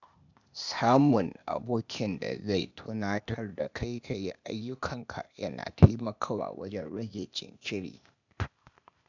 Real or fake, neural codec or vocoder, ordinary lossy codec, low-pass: fake; codec, 16 kHz, 0.8 kbps, ZipCodec; none; 7.2 kHz